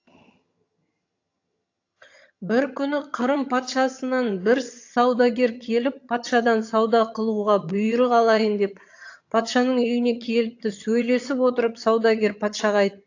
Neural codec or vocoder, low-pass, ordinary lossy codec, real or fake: vocoder, 22.05 kHz, 80 mel bands, HiFi-GAN; 7.2 kHz; AAC, 48 kbps; fake